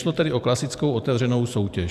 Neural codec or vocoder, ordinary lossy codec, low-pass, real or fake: vocoder, 44.1 kHz, 128 mel bands every 512 samples, BigVGAN v2; AAC, 96 kbps; 14.4 kHz; fake